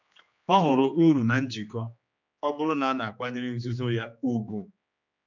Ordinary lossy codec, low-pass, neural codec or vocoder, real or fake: none; 7.2 kHz; codec, 16 kHz, 2 kbps, X-Codec, HuBERT features, trained on general audio; fake